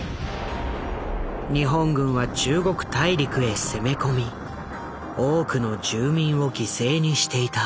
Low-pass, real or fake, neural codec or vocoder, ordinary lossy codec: none; real; none; none